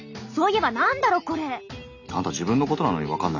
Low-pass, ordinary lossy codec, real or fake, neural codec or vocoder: 7.2 kHz; none; real; none